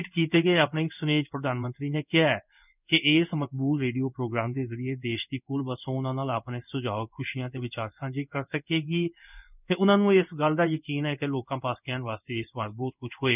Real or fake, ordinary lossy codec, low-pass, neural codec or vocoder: fake; none; 3.6 kHz; codec, 16 kHz in and 24 kHz out, 1 kbps, XY-Tokenizer